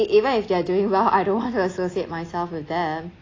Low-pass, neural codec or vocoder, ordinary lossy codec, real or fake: 7.2 kHz; none; AAC, 32 kbps; real